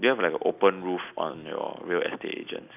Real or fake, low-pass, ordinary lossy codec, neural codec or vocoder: real; 3.6 kHz; none; none